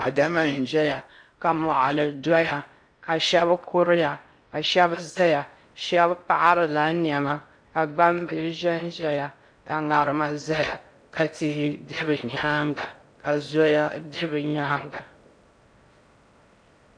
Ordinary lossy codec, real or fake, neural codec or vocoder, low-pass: AAC, 64 kbps; fake; codec, 16 kHz in and 24 kHz out, 0.6 kbps, FocalCodec, streaming, 4096 codes; 9.9 kHz